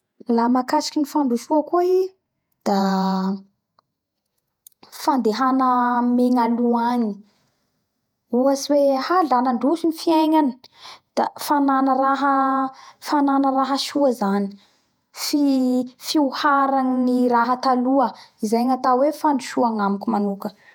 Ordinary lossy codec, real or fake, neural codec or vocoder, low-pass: none; fake; vocoder, 48 kHz, 128 mel bands, Vocos; 19.8 kHz